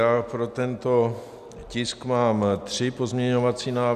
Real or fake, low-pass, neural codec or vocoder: real; 14.4 kHz; none